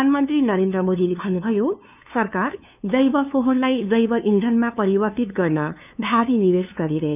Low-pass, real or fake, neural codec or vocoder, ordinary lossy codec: 3.6 kHz; fake; codec, 16 kHz, 2 kbps, FunCodec, trained on LibriTTS, 25 frames a second; none